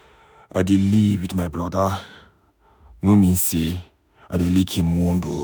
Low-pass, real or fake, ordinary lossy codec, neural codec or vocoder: none; fake; none; autoencoder, 48 kHz, 32 numbers a frame, DAC-VAE, trained on Japanese speech